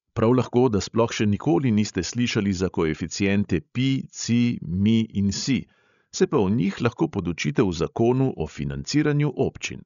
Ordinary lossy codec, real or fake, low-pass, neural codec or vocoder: none; fake; 7.2 kHz; codec, 16 kHz, 16 kbps, FreqCodec, larger model